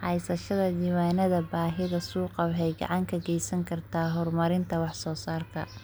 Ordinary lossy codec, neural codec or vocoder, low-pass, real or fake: none; none; none; real